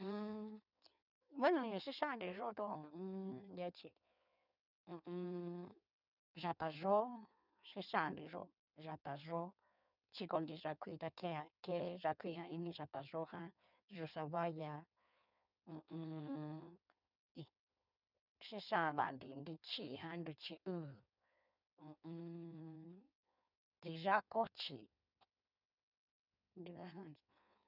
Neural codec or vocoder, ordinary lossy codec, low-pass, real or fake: codec, 16 kHz in and 24 kHz out, 1.1 kbps, FireRedTTS-2 codec; none; 5.4 kHz; fake